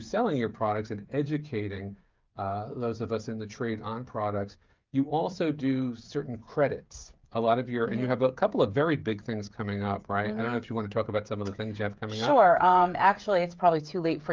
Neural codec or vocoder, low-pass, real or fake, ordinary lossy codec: codec, 16 kHz, 8 kbps, FreqCodec, smaller model; 7.2 kHz; fake; Opus, 24 kbps